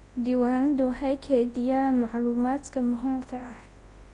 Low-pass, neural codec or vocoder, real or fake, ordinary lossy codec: 10.8 kHz; codec, 24 kHz, 0.9 kbps, WavTokenizer, large speech release; fake; AAC, 32 kbps